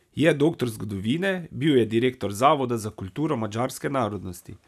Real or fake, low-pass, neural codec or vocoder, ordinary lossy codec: real; 14.4 kHz; none; none